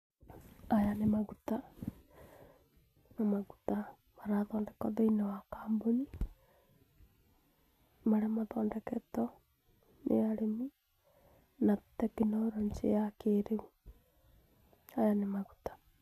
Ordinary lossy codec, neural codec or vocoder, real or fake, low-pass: none; none; real; 14.4 kHz